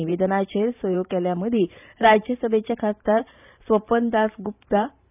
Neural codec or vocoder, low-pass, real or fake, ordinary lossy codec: vocoder, 44.1 kHz, 128 mel bands every 512 samples, BigVGAN v2; 3.6 kHz; fake; none